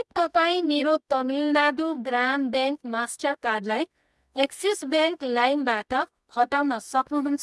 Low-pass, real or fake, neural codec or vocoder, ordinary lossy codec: none; fake; codec, 24 kHz, 0.9 kbps, WavTokenizer, medium music audio release; none